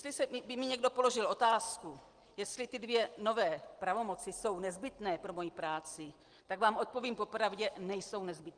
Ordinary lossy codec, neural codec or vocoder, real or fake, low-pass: Opus, 24 kbps; none; real; 9.9 kHz